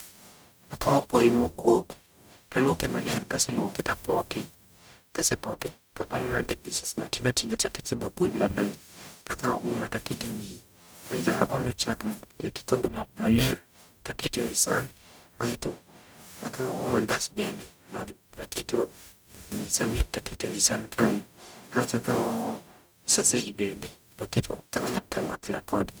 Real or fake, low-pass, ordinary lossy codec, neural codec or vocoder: fake; none; none; codec, 44.1 kHz, 0.9 kbps, DAC